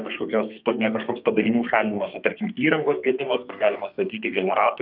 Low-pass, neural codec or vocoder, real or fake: 5.4 kHz; codec, 32 kHz, 1.9 kbps, SNAC; fake